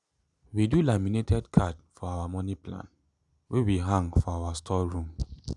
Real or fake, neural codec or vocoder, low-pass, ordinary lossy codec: real; none; 10.8 kHz; none